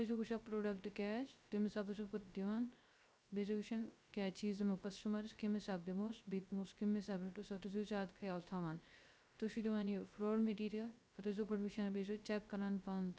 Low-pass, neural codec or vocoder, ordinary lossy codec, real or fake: none; codec, 16 kHz, 0.3 kbps, FocalCodec; none; fake